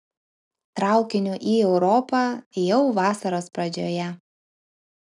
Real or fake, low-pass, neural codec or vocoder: real; 10.8 kHz; none